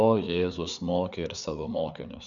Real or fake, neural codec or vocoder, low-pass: fake; codec, 16 kHz, 4 kbps, FreqCodec, larger model; 7.2 kHz